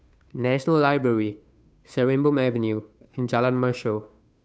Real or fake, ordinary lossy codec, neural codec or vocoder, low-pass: fake; none; codec, 16 kHz, 2 kbps, FunCodec, trained on Chinese and English, 25 frames a second; none